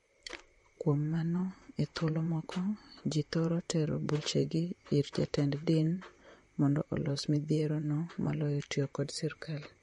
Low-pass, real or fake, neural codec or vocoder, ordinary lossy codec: 19.8 kHz; fake; vocoder, 44.1 kHz, 128 mel bands, Pupu-Vocoder; MP3, 48 kbps